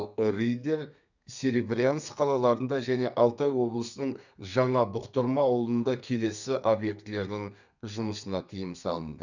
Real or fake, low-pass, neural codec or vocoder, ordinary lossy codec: fake; 7.2 kHz; codec, 32 kHz, 1.9 kbps, SNAC; none